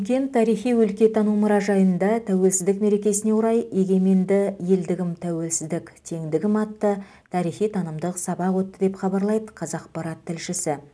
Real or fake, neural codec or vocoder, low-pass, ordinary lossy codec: real; none; none; none